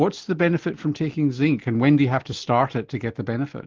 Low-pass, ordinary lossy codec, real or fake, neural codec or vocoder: 7.2 kHz; Opus, 16 kbps; real; none